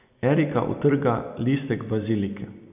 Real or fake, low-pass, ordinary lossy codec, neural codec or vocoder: real; 3.6 kHz; none; none